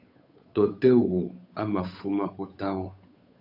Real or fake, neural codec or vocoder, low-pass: fake; codec, 16 kHz, 8 kbps, FunCodec, trained on Chinese and English, 25 frames a second; 5.4 kHz